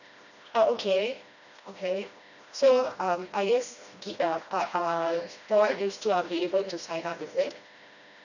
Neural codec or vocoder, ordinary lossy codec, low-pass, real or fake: codec, 16 kHz, 1 kbps, FreqCodec, smaller model; none; 7.2 kHz; fake